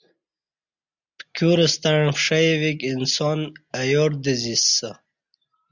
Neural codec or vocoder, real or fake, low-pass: none; real; 7.2 kHz